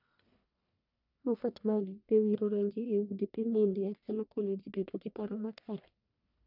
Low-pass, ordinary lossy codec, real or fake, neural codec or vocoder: 5.4 kHz; none; fake; codec, 44.1 kHz, 1.7 kbps, Pupu-Codec